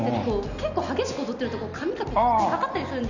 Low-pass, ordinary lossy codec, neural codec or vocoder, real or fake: 7.2 kHz; none; none; real